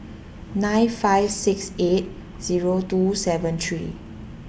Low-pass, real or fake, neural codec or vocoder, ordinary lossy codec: none; real; none; none